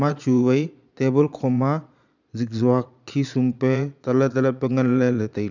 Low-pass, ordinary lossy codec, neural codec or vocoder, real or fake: 7.2 kHz; none; vocoder, 22.05 kHz, 80 mel bands, Vocos; fake